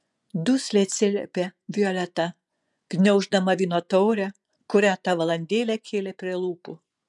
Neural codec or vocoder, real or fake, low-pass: vocoder, 24 kHz, 100 mel bands, Vocos; fake; 10.8 kHz